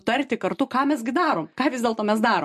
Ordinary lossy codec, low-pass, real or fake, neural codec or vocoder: MP3, 64 kbps; 14.4 kHz; real; none